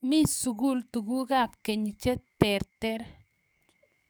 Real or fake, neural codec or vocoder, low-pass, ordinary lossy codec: fake; codec, 44.1 kHz, 7.8 kbps, DAC; none; none